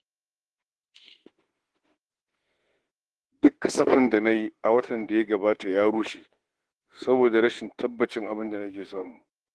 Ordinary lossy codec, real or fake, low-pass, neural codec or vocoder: Opus, 16 kbps; fake; 10.8 kHz; autoencoder, 48 kHz, 32 numbers a frame, DAC-VAE, trained on Japanese speech